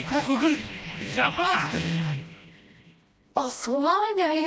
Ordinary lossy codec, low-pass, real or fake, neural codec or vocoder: none; none; fake; codec, 16 kHz, 1 kbps, FreqCodec, smaller model